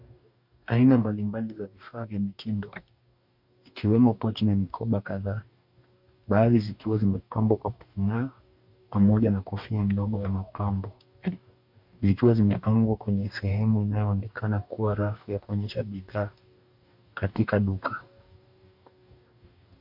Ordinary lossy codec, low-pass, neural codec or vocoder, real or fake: MP3, 48 kbps; 5.4 kHz; codec, 44.1 kHz, 2.6 kbps, DAC; fake